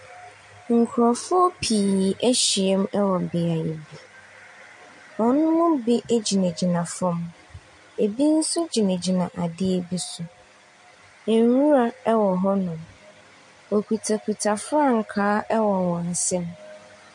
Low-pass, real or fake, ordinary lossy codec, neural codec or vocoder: 10.8 kHz; real; MP3, 48 kbps; none